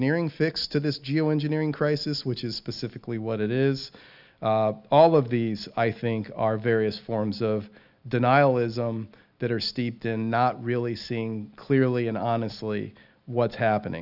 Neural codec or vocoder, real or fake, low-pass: none; real; 5.4 kHz